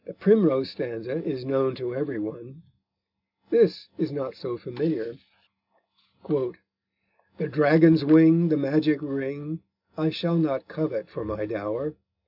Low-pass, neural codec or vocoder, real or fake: 5.4 kHz; none; real